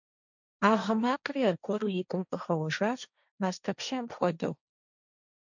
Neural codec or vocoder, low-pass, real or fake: codec, 16 kHz, 1.1 kbps, Voila-Tokenizer; 7.2 kHz; fake